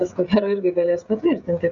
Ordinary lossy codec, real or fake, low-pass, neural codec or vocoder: MP3, 64 kbps; fake; 7.2 kHz; codec, 16 kHz, 16 kbps, FreqCodec, smaller model